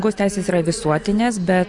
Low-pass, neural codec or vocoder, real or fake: 10.8 kHz; none; real